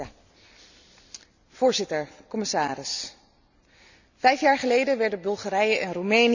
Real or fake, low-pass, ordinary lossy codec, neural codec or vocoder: real; 7.2 kHz; none; none